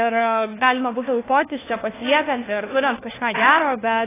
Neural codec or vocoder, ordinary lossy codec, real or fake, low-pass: codec, 16 kHz, 1 kbps, FunCodec, trained on LibriTTS, 50 frames a second; AAC, 16 kbps; fake; 3.6 kHz